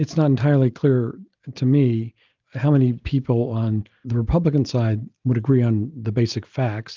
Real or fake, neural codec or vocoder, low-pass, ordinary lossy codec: real; none; 7.2 kHz; Opus, 32 kbps